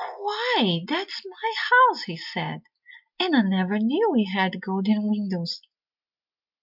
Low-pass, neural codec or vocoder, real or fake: 5.4 kHz; none; real